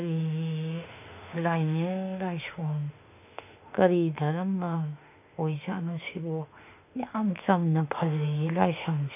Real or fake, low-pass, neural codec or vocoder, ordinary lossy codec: fake; 3.6 kHz; autoencoder, 48 kHz, 32 numbers a frame, DAC-VAE, trained on Japanese speech; none